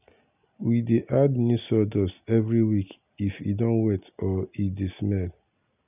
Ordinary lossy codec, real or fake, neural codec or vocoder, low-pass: none; real; none; 3.6 kHz